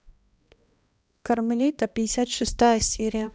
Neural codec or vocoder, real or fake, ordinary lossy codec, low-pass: codec, 16 kHz, 1 kbps, X-Codec, HuBERT features, trained on balanced general audio; fake; none; none